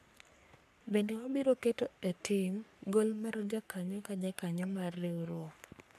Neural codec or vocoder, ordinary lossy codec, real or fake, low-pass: codec, 44.1 kHz, 3.4 kbps, Pupu-Codec; MP3, 96 kbps; fake; 14.4 kHz